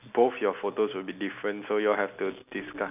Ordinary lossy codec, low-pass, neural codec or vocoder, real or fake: none; 3.6 kHz; none; real